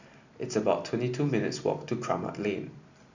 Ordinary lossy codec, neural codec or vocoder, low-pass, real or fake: Opus, 64 kbps; none; 7.2 kHz; real